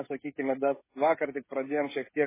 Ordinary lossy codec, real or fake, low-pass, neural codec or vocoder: MP3, 16 kbps; fake; 3.6 kHz; codec, 16 kHz, 16 kbps, FreqCodec, smaller model